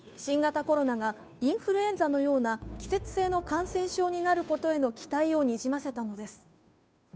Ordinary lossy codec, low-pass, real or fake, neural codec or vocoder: none; none; fake; codec, 16 kHz, 2 kbps, FunCodec, trained on Chinese and English, 25 frames a second